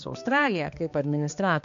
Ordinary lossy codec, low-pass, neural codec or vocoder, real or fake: AAC, 64 kbps; 7.2 kHz; codec, 16 kHz, 2 kbps, X-Codec, HuBERT features, trained on balanced general audio; fake